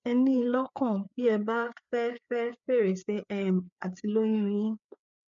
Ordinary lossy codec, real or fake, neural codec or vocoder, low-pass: none; fake; codec, 16 kHz, 4 kbps, FreqCodec, larger model; 7.2 kHz